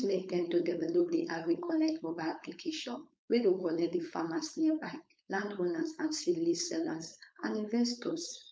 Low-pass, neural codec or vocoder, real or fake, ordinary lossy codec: none; codec, 16 kHz, 4.8 kbps, FACodec; fake; none